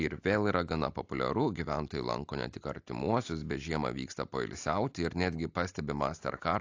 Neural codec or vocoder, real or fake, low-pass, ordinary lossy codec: none; real; 7.2 kHz; AAC, 48 kbps